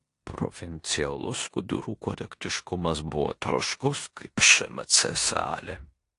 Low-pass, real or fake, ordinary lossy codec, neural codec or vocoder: 10.8 kHz; fake; AAC, 48 kbps; codec, 16 kHz in and 24 kHz out, 0.9 kbps, LongCat-Audio-Codec, four codebook decoder